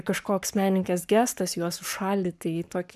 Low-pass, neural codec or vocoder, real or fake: 14.4 kHz; codec, 44.1 kHz, 7.8 kbps, Pupu-Codec; fake